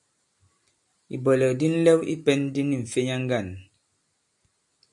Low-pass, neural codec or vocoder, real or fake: 10.8 kHz; none; real